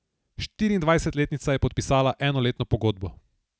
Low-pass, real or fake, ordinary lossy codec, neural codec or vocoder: none; real; none; none